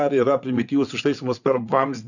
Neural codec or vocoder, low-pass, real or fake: vocoder, 22.05 kHz, 80 mel bands, WaveNeXt; 7.2 kHz; fake